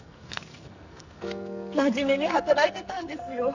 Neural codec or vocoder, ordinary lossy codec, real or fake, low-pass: codec, 44.1 kHz, 2.6 kbps, SNAC; none; fake; 7.2 kHz